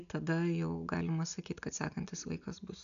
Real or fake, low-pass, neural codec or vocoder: real; 7.2 kHz; none